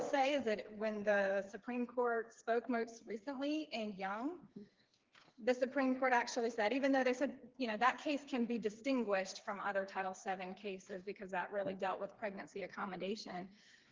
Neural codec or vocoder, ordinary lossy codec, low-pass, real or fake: codec, 16 kHz, 4 kbps, FreqCodec, smaller model; Opus, 16 kbps; 7.2 kHz; fake